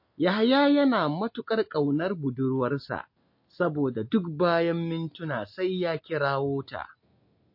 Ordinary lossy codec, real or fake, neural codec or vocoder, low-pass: MP3, 32 kbps; real; none; 5.4 kHz